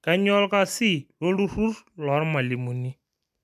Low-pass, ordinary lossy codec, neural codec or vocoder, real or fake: 14.4 kHz; none; none; real